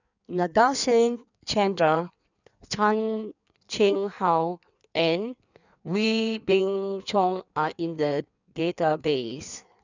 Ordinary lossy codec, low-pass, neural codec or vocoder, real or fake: none; 7.2 kHz; codec, 16 kHz in and 24 kHz out, 1.1 kbps, FireRedTTS-2 codec; fake